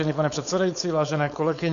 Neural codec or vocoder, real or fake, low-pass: codec, 16 kHz, 4.8 kbps, FACodec; fake; 7.2 kHz